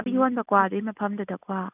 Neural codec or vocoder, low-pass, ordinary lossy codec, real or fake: none; 3.6 kHz; none; real